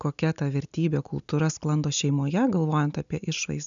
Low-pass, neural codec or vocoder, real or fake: 7.2 kHz; none; real